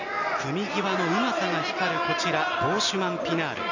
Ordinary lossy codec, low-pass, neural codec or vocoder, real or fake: none; 7.2 kHz; none; real